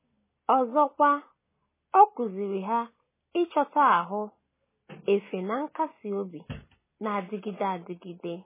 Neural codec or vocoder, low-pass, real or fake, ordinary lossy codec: none; 3.6 kHz; real; MP3, 16 kbps